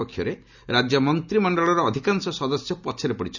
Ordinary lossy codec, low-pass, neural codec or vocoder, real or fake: none; 7.2 kHz; none; real